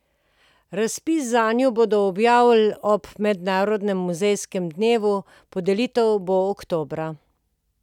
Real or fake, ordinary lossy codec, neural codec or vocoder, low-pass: real; none; none; 19.8 kHz